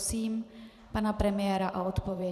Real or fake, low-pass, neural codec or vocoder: fake; 14.4 kHz; vocoder, 44.1 kHz, 128 mel bands every 512 samples, BigVGAN v2